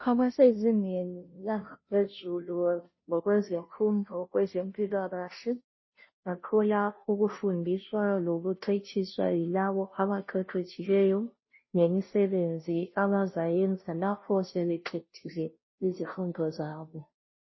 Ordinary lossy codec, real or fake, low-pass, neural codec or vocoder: MP3, 24 kbps; fake; 7.2 kHz; codec, 16 kHz, 0.5 kbps, FunCodec, trained on Chinese and English, 25 frames a second